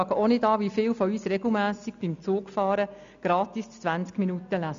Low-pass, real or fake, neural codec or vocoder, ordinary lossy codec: 7.2 kHz; real; none; AAC, 64 kbps